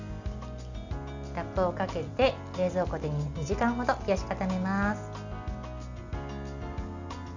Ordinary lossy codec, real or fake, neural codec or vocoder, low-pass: none; real; none; 7.2 kHz